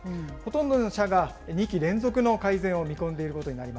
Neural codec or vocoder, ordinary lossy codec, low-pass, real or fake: none; none; none; real